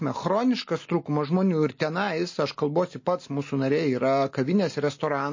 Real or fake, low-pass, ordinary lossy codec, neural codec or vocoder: real; 7.2 kHz; MP3, 32 kbps; none